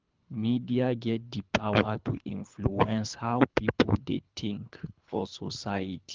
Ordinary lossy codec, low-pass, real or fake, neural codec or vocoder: Opus, 32 kbps; 7.2 kHz; fake; codec, 24 kHz, 3 kbps, HILCodec